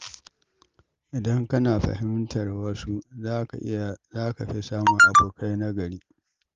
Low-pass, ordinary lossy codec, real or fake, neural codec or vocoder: 7.2 kHz; Opus, 32 kbps; real; none